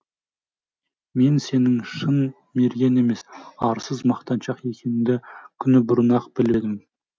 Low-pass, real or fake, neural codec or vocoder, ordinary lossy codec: none; real; none; none